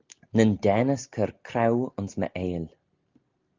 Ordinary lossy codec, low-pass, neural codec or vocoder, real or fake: Opus, 24 kbps; 7.2 kHz; none; real